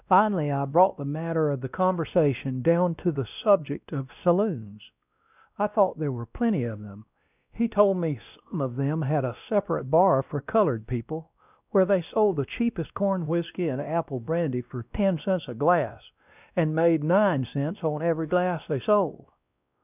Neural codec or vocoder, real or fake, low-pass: codec, 16 kHz, 1 kbps, X-Codec, WavLM features, trained on Multilingual LibriSpeech; fake; 3.6 kHz